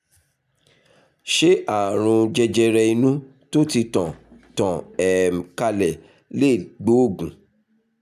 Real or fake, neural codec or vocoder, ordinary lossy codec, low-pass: real; none; none; 14.4 kHz